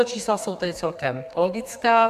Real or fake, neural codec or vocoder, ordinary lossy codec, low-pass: fake; codec, 32 kHz, 1.9 kbps, SNAC; AAC, 96 kbps; 14.4 kHz